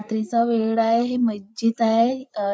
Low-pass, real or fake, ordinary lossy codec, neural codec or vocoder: none; fake; none; codec, 16 kHz, 8 kbps, FreqCodec, larger model